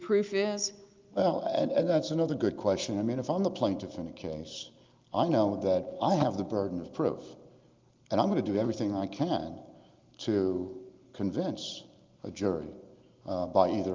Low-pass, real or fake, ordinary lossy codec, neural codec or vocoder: 7.2 kHz; real; Opus, 32 kbps; none